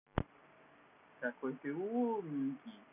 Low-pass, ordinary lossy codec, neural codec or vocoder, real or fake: 3.6 kHz; none; none; real